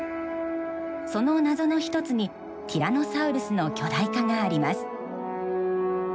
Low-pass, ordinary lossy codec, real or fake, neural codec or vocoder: none; none; real; none